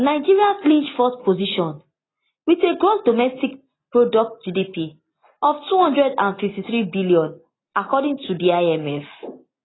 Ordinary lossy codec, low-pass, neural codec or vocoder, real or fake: AAC, 16 kbps; 7.2 kHz; none; real